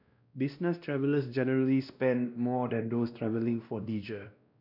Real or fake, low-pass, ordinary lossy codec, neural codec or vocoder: fake; 5.4 kHz; none; codec, 16 kHz, 1 kbps, X-Codec, WavLM features, trained on Multilingual LibriSpeech